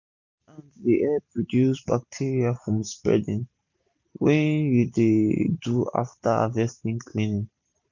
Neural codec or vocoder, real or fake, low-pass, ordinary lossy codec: none; real; 7.2 kHz; none